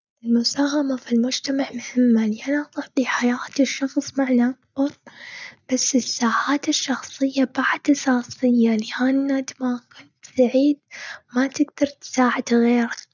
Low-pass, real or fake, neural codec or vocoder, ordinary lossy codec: 7.2 kHz; real; none; none